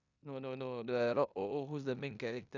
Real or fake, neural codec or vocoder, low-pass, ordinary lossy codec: fake; codec, 16 kHz in and 24 kHz out, 0.9 kbps, LongCat-Audio-Codec, four codebook decoder; 7.2 kHz; none